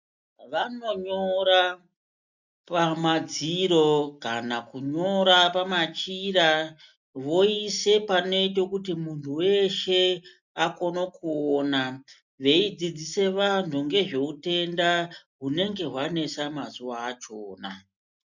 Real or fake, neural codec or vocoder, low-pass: real; none; 7.2 kHz